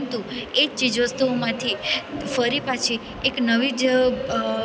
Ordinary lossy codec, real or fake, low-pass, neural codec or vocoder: none; real; none; none